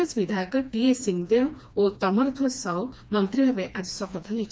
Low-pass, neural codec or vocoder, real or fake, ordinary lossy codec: none; codec, 16 kHz, 2 kbps, FreqCodec, smaller model; fake; none